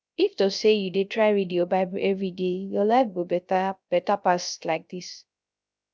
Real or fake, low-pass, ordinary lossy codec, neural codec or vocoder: fake; none; none; codec, 16 kHz, 0.3 kbps, FocalCodec